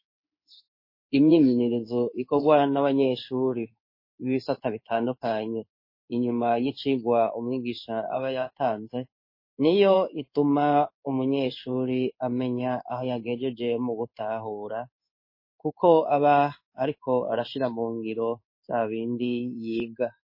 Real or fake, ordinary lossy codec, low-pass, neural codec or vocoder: fake; MP3, 24 kbps; 5.4 kHz; codec, 16 kHz in and 24 kHz out, 1 kbps, XY-Tokenizer